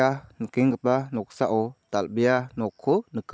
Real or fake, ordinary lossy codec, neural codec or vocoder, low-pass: real; none; none; none